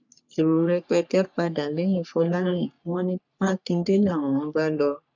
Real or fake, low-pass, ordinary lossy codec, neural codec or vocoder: fake; 7.2 kHz; none; codec, 44.1 kHz, 3.4 kbps, Pupu-Codec